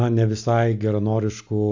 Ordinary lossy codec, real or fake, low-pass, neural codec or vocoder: AAC, 48 kbps; real; 7.2 kHz; none